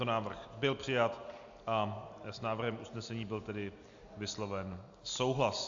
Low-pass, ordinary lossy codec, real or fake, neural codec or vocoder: 7.2 kHz; AAC, 64 kbps; real; none